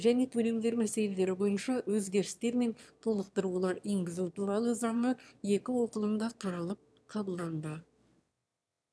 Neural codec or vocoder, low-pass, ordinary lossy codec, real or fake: autoencoder, 22.05 kHz, a latent of 192 numbers a frame, VITS, trained on one speaker; none; none; fake